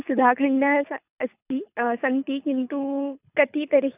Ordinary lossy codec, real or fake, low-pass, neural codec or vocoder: none; fake; 3.6 kHz; codec, 24 kHz, 6 kbps, HILCodec